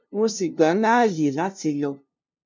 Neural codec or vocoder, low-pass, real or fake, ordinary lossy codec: codec, 16 kHz, 0.5 kbps, FunCodec, trained on LibriTTS, 25 frames a second; none; fake; none